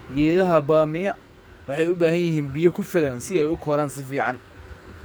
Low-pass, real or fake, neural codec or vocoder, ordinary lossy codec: none; fake; codec, 44.1 kHz, 2.6 kbps, SNAC; none